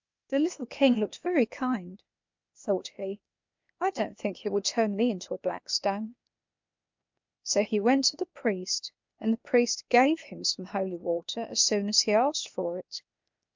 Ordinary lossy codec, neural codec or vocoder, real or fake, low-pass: MP3, 64 kbps; codec, 16 kHz, 0.8 kbps, ZipCodec; fake; 7.2 kHz